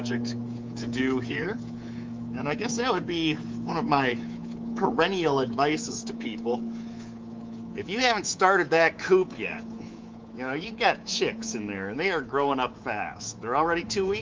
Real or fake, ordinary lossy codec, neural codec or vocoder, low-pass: fake; Opus, 24 kbps; codec, 24 kHz, 3.1 kbps, DualCodec; 7.2 kHz